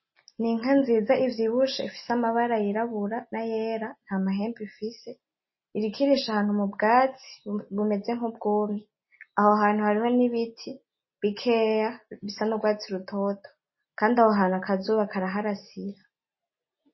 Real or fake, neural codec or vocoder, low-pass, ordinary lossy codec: real; none; 7.2 kHz; MP3, 24 kbps